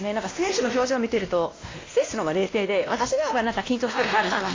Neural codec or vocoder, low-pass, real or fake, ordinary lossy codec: codec, 16 kHz, 1 kbps, X-Codec, WavLM features, trained on Multilingual LibriSpeech; 7.2 kHz; fake; AAC, 32 kbps